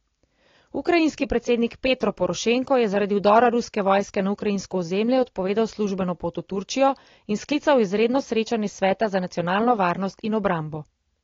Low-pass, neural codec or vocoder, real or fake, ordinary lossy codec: 7.2 kHz; none; real; AAC, 32 kbps